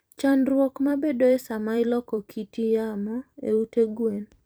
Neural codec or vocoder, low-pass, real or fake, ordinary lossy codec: vocoder, 44.1 kHz, 128 mel bands every 256 samples, BigVGAN v2; none; fake; none